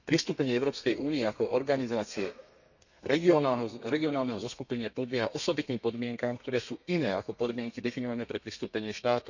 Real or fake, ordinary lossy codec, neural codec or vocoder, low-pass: fake; none; codec, 32 kHz, 1.9 kbps, SNAC; 7.2 kHz